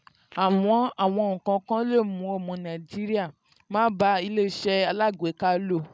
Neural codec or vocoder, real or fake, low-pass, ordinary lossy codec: none; real; none; none